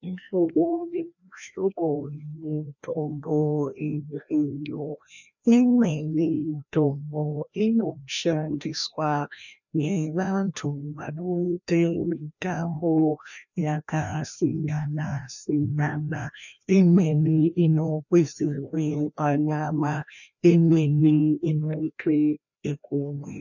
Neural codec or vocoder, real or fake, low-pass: codec, 16 kHz, 1 kbps, FreqCodec, larger model; fake; 7.2 kHz